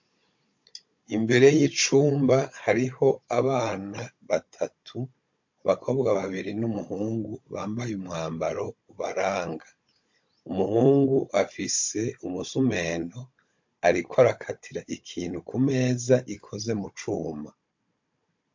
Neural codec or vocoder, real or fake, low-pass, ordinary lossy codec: codec, 16 kHz, 16 kbps, FunCodec, trained on Chinese and English, 50 frames a second; fake; 7.2 kHz; MP3, 48 kbps